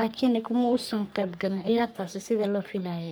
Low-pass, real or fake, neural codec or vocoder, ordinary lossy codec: none; fake; codec, 44.1 kHz, 3.4 kbps, Pupu-Codec; none